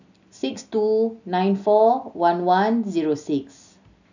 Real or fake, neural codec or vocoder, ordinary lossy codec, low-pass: real; none; none; 7.2 kHz